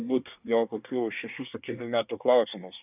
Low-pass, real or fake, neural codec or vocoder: 3.6 kHz; fake; codec, 24 kHz, 1 kbps, SNAC